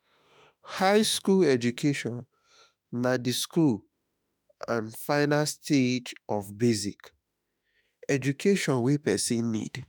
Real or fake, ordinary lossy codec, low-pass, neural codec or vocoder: fake; none; none; autoencoder, 48 kHz, 32 numbers a frame, DAC-VAE, trained on Japanese speech